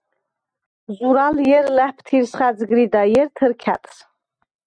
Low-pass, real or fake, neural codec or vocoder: 9.9 kHz; real; none